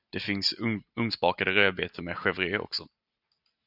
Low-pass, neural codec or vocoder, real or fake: 5.4 kHz; none; real